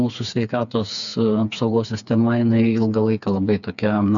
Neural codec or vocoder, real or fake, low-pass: codec, 16 kHz, 4 kbps, FreqCodec, smaller model; fake; 7.2 kHz